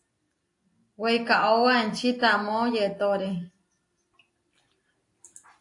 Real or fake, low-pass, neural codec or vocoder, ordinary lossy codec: real; 10.8 kHz; none; AAC, 48 kbps